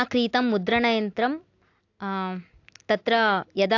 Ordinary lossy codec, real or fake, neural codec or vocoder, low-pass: AAC, 48 kbps; real; none; 7.2 kHz